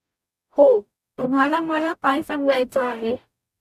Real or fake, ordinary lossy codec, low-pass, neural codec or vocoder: fake; MP3, 96 kbps; 14.4 kHz; codec, 44.1 kHz, 0.9 kbps, DAC